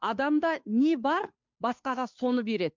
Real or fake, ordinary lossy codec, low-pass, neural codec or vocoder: fake; MP3, 64 kbps; 7.2 kHz; codec, 16 kHz, 2 kbps, FunCodec, trained on Chinese and English, 25 frames a second